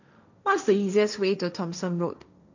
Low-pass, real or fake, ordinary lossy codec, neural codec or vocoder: 7.2 kHz; fake; none; codec, 16 kHz, 1.1 kbps, Voila-Tokenizer